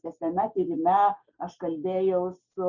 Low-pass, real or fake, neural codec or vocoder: 7.2 kHz; real; none